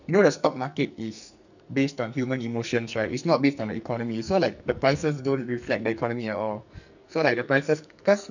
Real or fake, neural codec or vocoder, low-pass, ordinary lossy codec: fake; codec, 44.1 kHz, 2.6 kbps, SNAC; 7.2 kHz; none